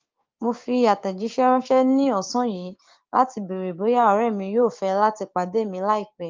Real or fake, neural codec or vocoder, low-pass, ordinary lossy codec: fake; codec, 16 kHz, 6 kbps, DAC; 7.2 kHz; Opus, 24 kbps